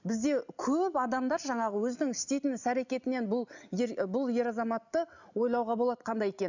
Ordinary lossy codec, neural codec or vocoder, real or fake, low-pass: none; none; real; 7.2 kHz